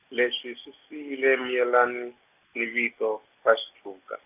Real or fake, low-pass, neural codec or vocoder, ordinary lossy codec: real; 3.6 kHz; none; none